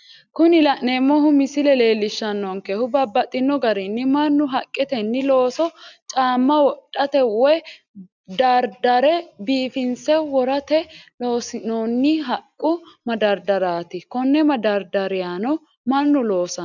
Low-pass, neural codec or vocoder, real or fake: 7.2 kHz; none; real